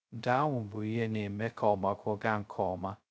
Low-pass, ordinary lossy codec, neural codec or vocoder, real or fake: none; none; codec, 16 kHz, 0.2 kbps, FocalCodec; fake